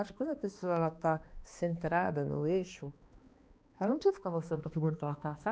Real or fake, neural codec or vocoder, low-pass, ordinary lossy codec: fake; codec, 16 kHz, 2 kbps, X-Codec, HuBERT features, trained on balanced general audio; none; none